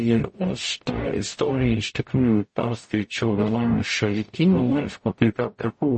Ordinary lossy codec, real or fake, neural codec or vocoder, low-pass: MP3, 32 kbps; fake; codec, 44.1 kHz, 0.9 kbps, DAC; 10.8 kHz